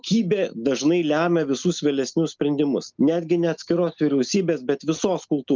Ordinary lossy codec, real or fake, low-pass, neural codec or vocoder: Opus, 24 kbps; real; 7.2 kHz; none